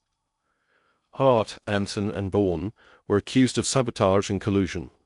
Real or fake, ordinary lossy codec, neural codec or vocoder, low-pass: fake; none; codec, 16 kHz in and 24 kHz out, 0.6 kbps, FocalCodec, streaming, 2048 codes; 10.8 kHz